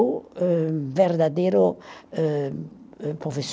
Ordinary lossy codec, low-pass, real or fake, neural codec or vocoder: none; none; real; none